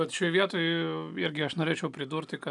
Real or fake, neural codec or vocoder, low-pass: real; none; 10.8 kHz